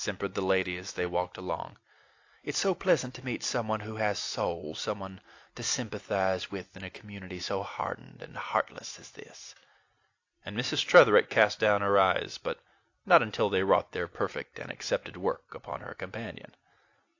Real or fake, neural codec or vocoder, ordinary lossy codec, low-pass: real; none; AAC, 48 kbps; 7.2 kHz